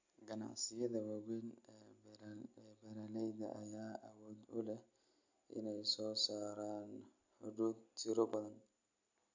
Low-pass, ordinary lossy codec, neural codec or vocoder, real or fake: 7.2 kHz; MP3, 48 kbps; none; real